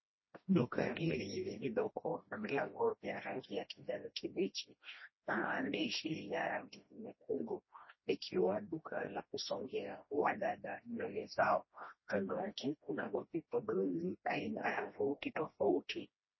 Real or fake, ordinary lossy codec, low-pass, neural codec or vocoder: fake; MP3, 24 kbps; 7.2 kHz; codec, 16 kHz, 1 kbps, FreqCodec, smaller model